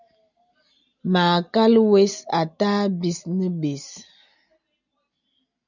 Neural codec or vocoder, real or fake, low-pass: none; real; 7.2 kHz